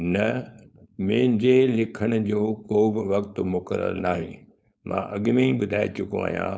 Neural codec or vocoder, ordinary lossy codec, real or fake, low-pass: codec, 16 kHz, 4.8 kbps, FACodec; none; fake; none